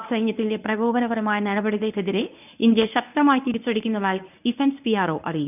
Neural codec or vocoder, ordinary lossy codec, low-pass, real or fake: codec, 24 kHz, 0.9 kbps, WavTokenizer, medium speech release version 1; none; 3.6 kHz; fake